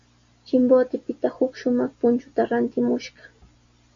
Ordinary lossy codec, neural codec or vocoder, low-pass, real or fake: MP3, 64 kbps; none; 7.2 kHz; real